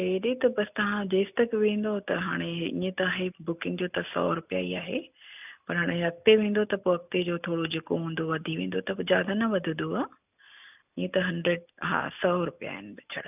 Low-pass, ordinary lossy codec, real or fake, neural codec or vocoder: 3.6 kHz; none; real; none